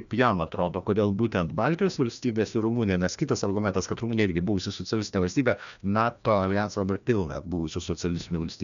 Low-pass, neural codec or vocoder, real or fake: 7.2 kHz; codec, 16 kHz, 1 kbps, FreqCodec, larger model; fake